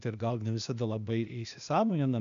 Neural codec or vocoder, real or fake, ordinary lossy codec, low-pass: codec, 16 kHz, 0.8 kbps, ZipCodec; fake; AAC, 96 kbps; 7.2 kHz